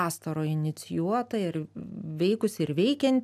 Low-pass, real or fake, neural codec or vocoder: 14.4 kHz; real; none